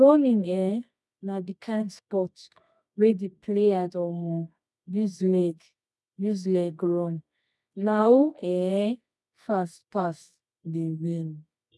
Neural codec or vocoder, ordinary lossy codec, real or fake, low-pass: codec, 24 kHz, 0.9 kbps, WavTokenizer, medium music audio release; none; fake; none